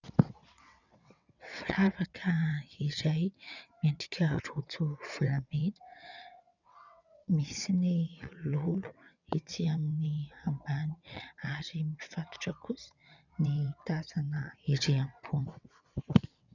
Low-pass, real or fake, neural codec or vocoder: 7.2 kHz; fake; vocoder, 22.05 kHz, 80 mel bands, WaveNeXt